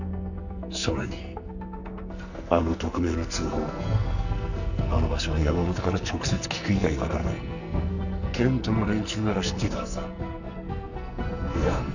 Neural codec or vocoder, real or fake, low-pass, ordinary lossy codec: codec, 44.1 kHz, 2.6 kbps, SNAC; fake; 7.2 kHz; none